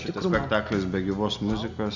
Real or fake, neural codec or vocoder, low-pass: real; none; 7.2 kHz